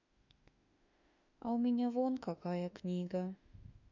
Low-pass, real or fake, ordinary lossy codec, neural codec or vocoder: 7.2 kHz; fake; none; autoencoder, 48 kHz, 32 numbers a frame, DAC-VAE, trained on Japanese speech